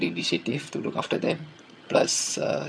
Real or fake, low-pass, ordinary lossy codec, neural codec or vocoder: fake; none; none; vocoder, 22.05 kHz, 80 mel bands, HiFi-GAN